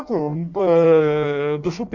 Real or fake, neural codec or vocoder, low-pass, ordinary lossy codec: fake; codec, 16 kHz in and 24 kHz out, 1.1 kbps, FireRedTTS-2 codec; 7.2 kHz; none